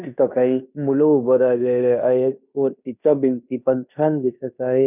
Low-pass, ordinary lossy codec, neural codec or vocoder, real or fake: 3.6 kHz; none; codec, 16 kHz in and 24 kHz out, 0.9 kbps, LongCat-Audio-Codec, fine tuned four codebook decoder; fake